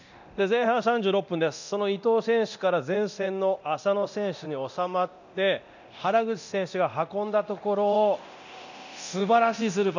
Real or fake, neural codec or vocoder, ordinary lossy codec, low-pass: fake; codec, 24 kHz, 0.9 kbps, DualCodec; none; 7.2 kHz